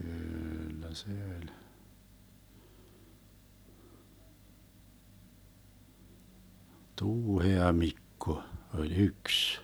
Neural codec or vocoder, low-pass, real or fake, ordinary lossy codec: none; none; real; none